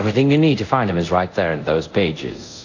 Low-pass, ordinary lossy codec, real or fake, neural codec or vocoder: 7.2 kHz; AAC, 48 kbps; fake; codec, 24 kHz, 0.5 kbps, DualCodec